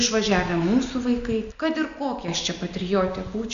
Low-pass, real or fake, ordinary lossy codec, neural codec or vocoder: 7.2 kHz; real; Opus, 64 kbps; none